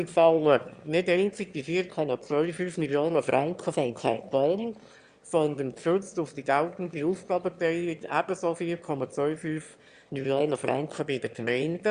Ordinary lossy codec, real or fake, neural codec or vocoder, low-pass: Opus, 64 kbps; fake; autoencoder, 22.05 kHz, a latent of 192 numbers a frame, VITS, trained on one speaker; 9.9 kHz